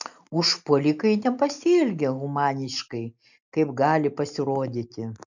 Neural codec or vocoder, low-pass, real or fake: none; 7.2 kHz; real